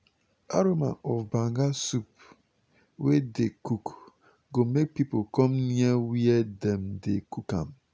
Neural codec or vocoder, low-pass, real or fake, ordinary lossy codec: none; none; real; none